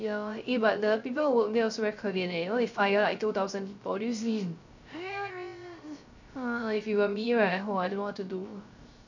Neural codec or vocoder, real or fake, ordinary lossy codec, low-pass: codec, 16 kHz, 0.3 kbps, FocalCodec; fake; none; 7.2 kHz